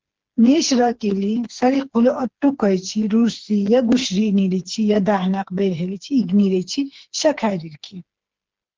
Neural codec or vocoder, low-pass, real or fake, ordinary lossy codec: codec, 16 kHz, 4 kbps, FreqCodec, smaller model; 7.2 kHz; fake; Opus, 16 kbps